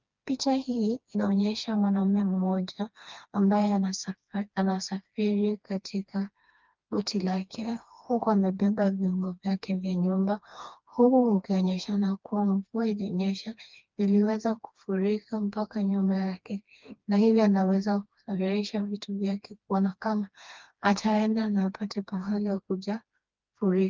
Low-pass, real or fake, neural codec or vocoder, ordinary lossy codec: 7.2 kHz; fake; codec, 16 kHz, 2 kbps, FreqCodec, smaller model; Opus, 24 kbps